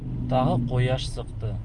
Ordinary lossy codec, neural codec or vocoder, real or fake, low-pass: Opus, 32 kbps; none; real; 10.8 kHz